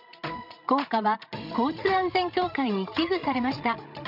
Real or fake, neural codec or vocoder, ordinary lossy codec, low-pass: fake; codec, 16 kHz, 16 kbps, FreqCodec, larger model; none; 5.4 kHz